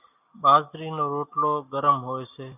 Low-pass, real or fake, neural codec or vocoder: 3.6 kHz; real; none